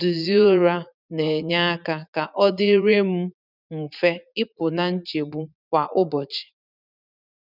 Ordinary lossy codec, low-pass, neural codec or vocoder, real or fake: none; 5.4 kHz; vocoder, 44.1 kHz, 80 mel bands, Vocos; fake